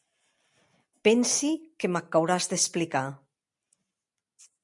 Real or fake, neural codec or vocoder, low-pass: real; none; 10.8 kHz